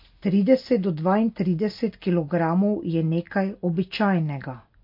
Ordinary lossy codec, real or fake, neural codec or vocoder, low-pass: MP3, 32 kbps; real; none; 5.4 kHz